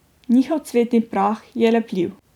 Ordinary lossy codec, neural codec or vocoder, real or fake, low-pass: none; vocoder, 44.1 kHz, 128 mel bands every 256 samples, BigVGAN v2; fake; 19.8 kHz